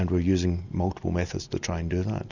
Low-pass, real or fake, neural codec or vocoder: 7.2 kHz; real; none